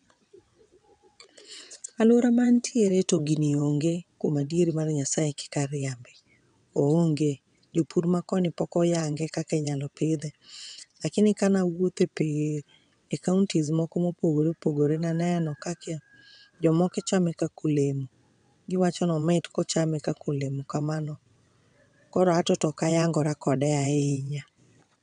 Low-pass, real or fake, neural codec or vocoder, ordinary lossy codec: 9.9 kHz; fake; vocoder, 22.05 kHz, 80 mel bands, WaveNeXt; none